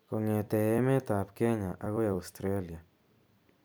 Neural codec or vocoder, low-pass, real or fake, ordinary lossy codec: vocoder, 44.1 kHz, 128 mel bands every 256 samples, BigVGAN v2; none; fake; none